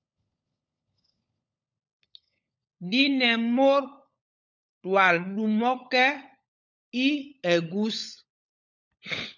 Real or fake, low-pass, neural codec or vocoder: fake; 7.2 kHz; codec, 16 kHz, 16 kbps, FunCodec, trained on LibriTTS, 50 frames a second